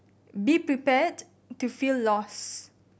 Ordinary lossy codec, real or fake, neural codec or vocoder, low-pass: none; real; none; none